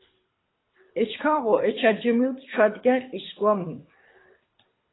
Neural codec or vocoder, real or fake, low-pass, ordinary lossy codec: codec, 24 kHz, 6 kbps, HILCodec; fake; 7.2 kHz; AAC, 16 kbps